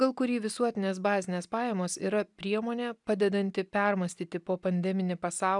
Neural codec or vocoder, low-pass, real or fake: none; 10.8 kHz; real